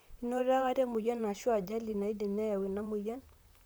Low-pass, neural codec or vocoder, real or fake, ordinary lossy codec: none; vocoder, 44.1 kHz, 128 mel bands, Pupu-Vocoder; fake; none